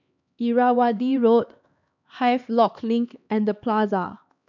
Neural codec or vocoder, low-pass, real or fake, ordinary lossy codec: codec, 16 kHz, 2 kbps, X-Codec, HuBERT features, trained on LibriSpeech; 7.2 kHz; fake; none